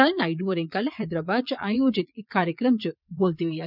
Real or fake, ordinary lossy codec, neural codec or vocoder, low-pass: fake; none; vocoder, 22.05 kHz, 80 mel bands, Vocos; 5.4 kHz